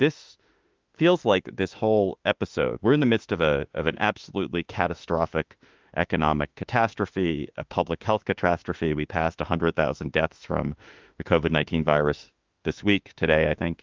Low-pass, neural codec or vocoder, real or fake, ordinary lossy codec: 7.2 kHz; autoencoder, 48 kHz, 32 numbers a frame, DAC-VAE, trained on Japanese speech; fake; Opus, 32 kbps